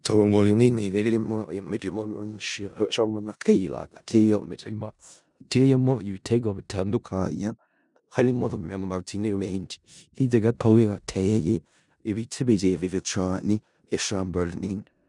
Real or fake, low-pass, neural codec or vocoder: fake; 10.8 kHz; codec, 16 kHz in and 24 kHz out, 0.4 kbps, LongCat-Audio-Codec, four codebook decoder